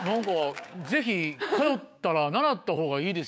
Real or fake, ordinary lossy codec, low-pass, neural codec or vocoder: fake; none; none; codec, 16 kHz, 6 kbps, DAC